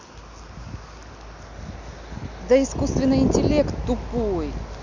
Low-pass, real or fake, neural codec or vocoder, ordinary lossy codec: 7.2 kHz; real; none; none